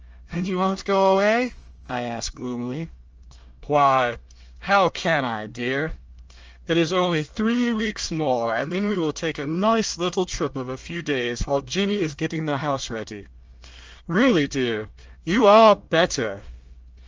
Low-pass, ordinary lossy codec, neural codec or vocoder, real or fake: 7.2 kHz; Opus, 24 kbps; codec, 24 kHz, 1 kbps, SNAC; fake